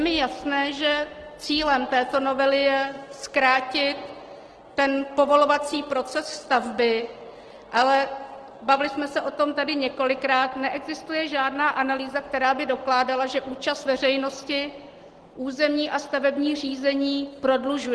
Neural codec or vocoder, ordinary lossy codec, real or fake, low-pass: none; Opus, 16 kbps; real; 10.8 kHz